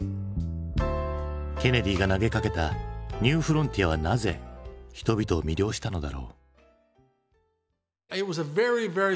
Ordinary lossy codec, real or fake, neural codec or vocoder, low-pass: none; real; none; none